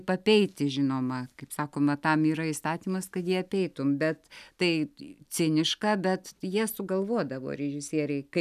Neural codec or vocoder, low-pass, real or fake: none; 14.4 kHz; real